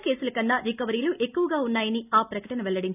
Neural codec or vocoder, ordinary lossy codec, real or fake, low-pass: none; none; real; 3.6 kHz